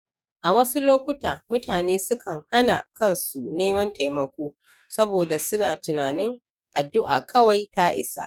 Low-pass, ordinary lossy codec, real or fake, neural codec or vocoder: 19.8 kHz; none; fake; codec, 44.1 kHz, 2.6 kbps, DAC